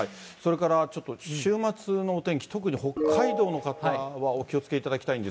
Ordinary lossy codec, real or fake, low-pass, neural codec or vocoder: none; real; none; none